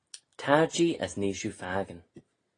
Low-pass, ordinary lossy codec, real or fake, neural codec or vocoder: 9.9 kHz; AAC, 32 kbps; real; none